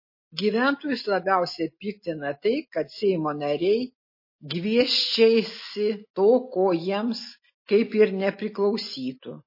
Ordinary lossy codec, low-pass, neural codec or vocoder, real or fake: MP3, 24 kbps; 5.4 kHz; none; real